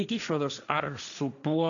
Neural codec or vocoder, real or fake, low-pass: codec, 16 kHz, 1.1 kbps, Voila-Tokenizer; fake; 7.2 kHz